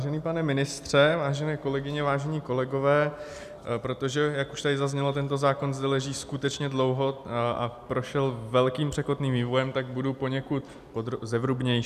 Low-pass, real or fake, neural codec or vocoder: 14.4 kHz; real; none